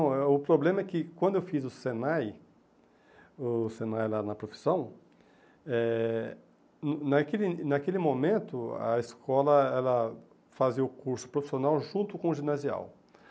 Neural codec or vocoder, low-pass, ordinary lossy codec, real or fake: none; none; none; real